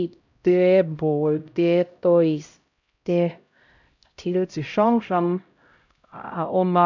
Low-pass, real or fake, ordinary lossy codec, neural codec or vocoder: 7.2 kHz; fake; none; codec, 16 kHz, 0.5 kbps, X-Codec, HuBERT features, trained on LibriSpeech